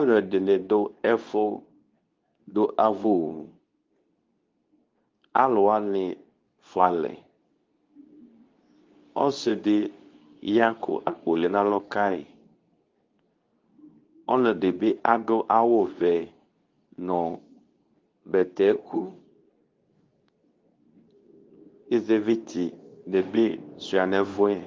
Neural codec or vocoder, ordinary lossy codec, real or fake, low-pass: codec, 24 kHz, 0.9 kbps, WavTokenizer, medium speech release version 2; Opus, 32 kbps; fake; 7.2 kHz